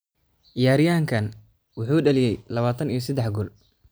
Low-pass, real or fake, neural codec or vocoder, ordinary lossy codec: none; real; none; none